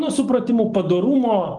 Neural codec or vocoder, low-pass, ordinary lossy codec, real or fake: none; 10.8 kHz; Opus, 24 kbps; real